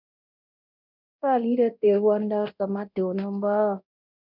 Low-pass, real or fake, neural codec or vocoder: 5.4 kHz; fake; codec, 24 kHz, 0.9 kbps, DualCodec